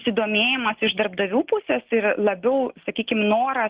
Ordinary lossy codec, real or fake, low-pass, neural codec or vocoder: Opus, 16 kbps; real; 3.6 kHz; none